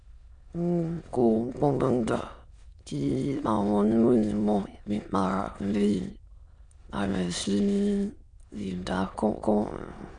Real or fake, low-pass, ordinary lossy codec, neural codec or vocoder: fake; 9.9 kHz; none; autoencoder, 22.05 kHz, a latent of 192 numbers a frame, VITS, trained on many speakers